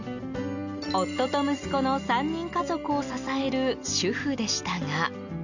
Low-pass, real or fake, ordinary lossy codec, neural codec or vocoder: 7.2 kHz; real; none; none